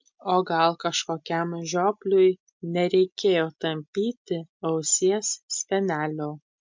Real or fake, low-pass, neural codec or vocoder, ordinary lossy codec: real; 7.2 kHz; none; MP3, 64 kbps